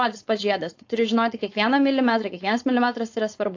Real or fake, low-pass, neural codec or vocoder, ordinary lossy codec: fake; 7.2 kHz; codec, 16 kHz, 4.8 kbps, FACodec; AAC, 48 kbps